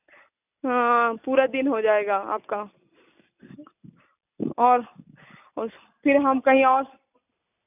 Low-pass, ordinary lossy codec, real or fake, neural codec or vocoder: 3.6 kHz; none; real; none